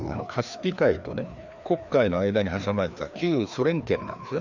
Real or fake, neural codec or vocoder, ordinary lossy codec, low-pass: fake; codec, 16 kHz, 2 kbps, FreqCodec, larger model; none; 7.2 kHz